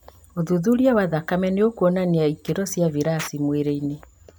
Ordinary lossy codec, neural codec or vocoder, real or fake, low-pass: none; none; real; none